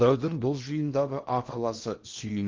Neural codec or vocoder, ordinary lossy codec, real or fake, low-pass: codec, 16 kHz in and 24 kHz out, 0.6 kbps, FocalCodec, streaming, 2048 codes; Opus, 32 kbps; fake; 7.2 kHz